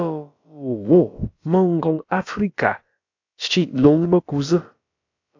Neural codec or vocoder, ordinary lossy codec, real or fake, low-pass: codec, 16 kHz, about 1 kbps, DyCAST, with the encoder's durations; AAC, 48 kbps; fake; 7.2 kHz